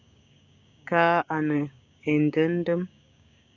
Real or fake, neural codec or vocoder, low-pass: fake; codec, 16 kHz, 6 kbps, DAC; 7.2 kHz